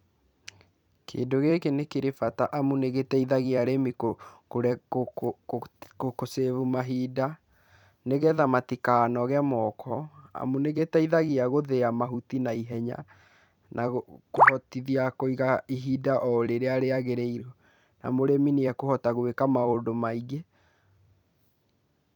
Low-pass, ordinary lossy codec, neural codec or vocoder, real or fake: 19.8 kHz; none; vocoder, 44.1 kHz, 128 mel bands every 256 samples, BigVGAN v2; fake